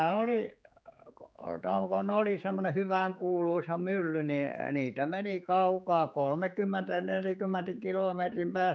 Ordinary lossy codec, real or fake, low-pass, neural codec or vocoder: none; fake; none; codec, 16 kHz, 4 kbps, X-Codec, HuBERT features, trained on general audio